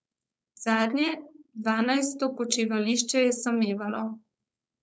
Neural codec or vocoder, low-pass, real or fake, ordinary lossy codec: codec, 16 kHz, 4.8 kbps, FACodec; none; fake; none